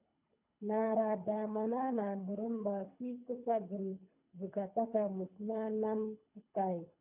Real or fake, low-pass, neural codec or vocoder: fake; 3.6 kHz; codec, 24 kHz, 3 kbps, HILCodec